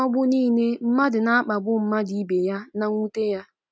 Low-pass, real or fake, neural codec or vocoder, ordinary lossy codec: none; real; none; none